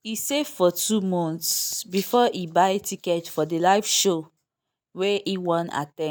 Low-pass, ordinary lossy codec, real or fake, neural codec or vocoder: none; none; real; none